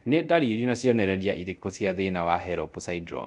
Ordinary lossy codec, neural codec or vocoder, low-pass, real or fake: none; codec, 24 kHz, 0.5 kbps, DualCodec; 10.8 kHz; fake